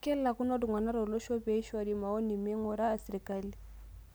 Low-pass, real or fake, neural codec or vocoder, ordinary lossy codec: none; real; none; none